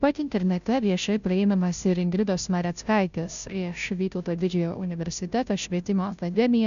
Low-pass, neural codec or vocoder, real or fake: 7.2 kHz; codec, 16 kHz, 0.5 kbps, FunCodec, trained on Chinese and English, 25 frames a second; fake